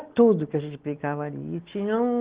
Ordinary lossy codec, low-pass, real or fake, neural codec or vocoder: Opus, 16 kbps; 3.6 kHz; real; none